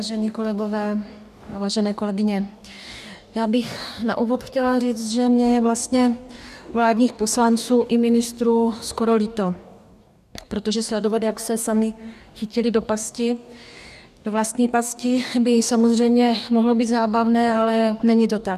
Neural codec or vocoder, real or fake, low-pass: codec, 44.1 kHz, 2.6 kbps, DAC; fake; 14.4 kHz